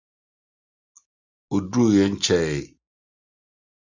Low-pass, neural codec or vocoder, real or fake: 7.2 kHz; none; real